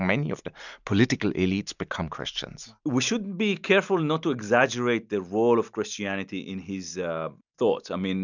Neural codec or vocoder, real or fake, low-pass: none; real; 7.2 kHz